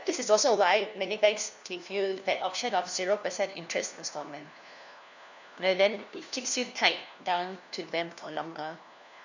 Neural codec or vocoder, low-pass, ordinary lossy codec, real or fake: codec, 16 kHz, 1 kbps, FunCodec, trained on LibriTTS, 50 frames a second; 7.2 kHz; none; fake